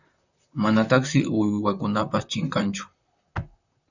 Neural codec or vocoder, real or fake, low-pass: vocoder, 44.1 kHz, 128 mel bands, Pupu-Vocoder; fake; 7.2 kHz